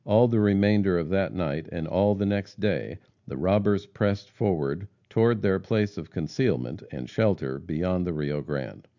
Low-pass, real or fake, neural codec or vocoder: 7.2 kHz; real; none